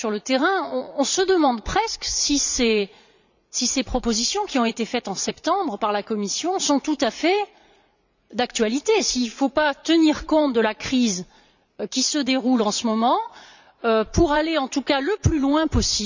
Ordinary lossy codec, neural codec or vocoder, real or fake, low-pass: none; vocoder, 44.1 kHz, 80 mel bands, Vocos; fake; 7.2 kHz